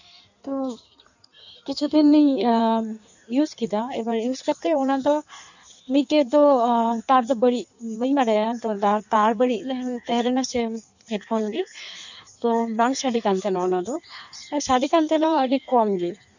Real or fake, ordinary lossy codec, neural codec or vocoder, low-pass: fake; none; codec, 16 kHz in and 24 kHz out, 1.1 kbps, FireRedTTS-2 codec; 7.2 kHz